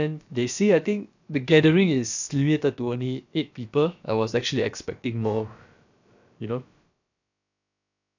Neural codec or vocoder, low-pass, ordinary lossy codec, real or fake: codec, 16 kHz, about 1 kbps, DyCAST, with the encoder's durations; 7.2 kHz; none; fake